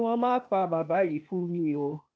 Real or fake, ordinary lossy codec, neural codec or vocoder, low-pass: fake; none; codec, 16 kHz, 0.8 kbps, ZipCodec; none